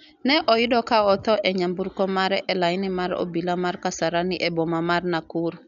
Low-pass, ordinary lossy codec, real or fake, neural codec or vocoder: 7.2 kHz; none; real; none